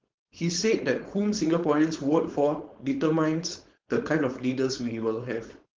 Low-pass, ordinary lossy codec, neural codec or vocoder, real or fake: 7.2 kHz; Opus, 16 kbps; codec, 16 kHz, 4.8 kbps, FACodec; fake